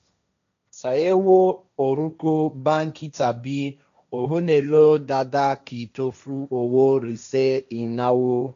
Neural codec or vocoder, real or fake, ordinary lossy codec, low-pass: codec, 16 kHz, 1.1 kbps, Voila-Tokenizer; fake; none; 7.2 kHz